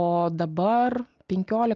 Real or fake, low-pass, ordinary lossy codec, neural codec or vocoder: real; 7.2 kHz; Opus, 32 kbps; none